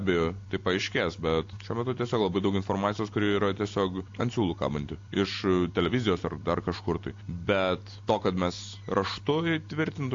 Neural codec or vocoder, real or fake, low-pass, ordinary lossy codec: none; real; 7.2 kHz; AAC, 48 kbps